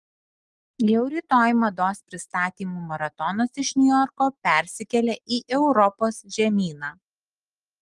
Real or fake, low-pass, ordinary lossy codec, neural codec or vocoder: real; 10.8 kHz; Opus, 24 kbps; none